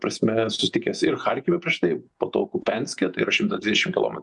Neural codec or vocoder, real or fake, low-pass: vocoder, 48 kHz, 128 mel bands, Vocos; fake; 10.8 kHz